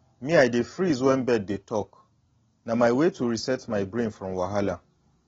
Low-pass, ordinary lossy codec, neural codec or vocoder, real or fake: 7.2 kHz; AAC, 24 kbps; none; real